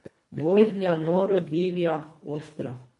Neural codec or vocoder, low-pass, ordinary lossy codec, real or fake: codec, 24 kHz, 1.5 kbps, HILCodec; 10.8 kHz; MP3, 48 kbps; fake